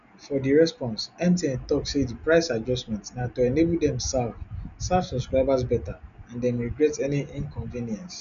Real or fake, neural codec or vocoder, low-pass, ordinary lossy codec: real; none; 7.2 kHz; none